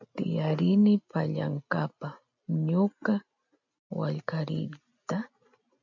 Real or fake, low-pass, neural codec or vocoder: real; 7.2 kHz; none